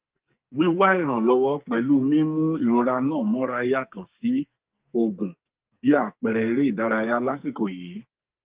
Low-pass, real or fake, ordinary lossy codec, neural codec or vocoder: 3.6 kHz; fake; Opus, 32 kbps; codec, 44.1 kHz, 2.6 kbps, SNAC